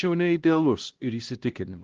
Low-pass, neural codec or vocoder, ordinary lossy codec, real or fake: 7.2 kHz; codec, 16 kHz, 1 kbps, X-Codec, HuBERT features, trained on LibriSpeech; Opus, 16 kbps; fake